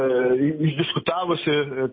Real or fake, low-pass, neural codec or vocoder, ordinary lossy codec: real; 7.2 kHz; none; MP3, 24 kbps